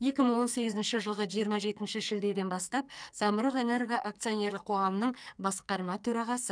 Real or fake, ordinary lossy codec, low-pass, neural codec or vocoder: fake; none; 9.9 kHz; codec, 44.1 kHz, 2.6 kbps, SNAC